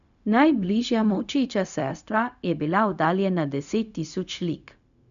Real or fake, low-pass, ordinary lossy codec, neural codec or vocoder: fake; 7.2 kHz; none; codec, 16 kHz, 0.4 kbps, LongCat-Audio-Codec